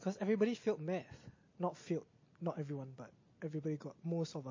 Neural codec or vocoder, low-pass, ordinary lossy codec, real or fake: none; 7.2 kHz; MP3, 32 kbps; real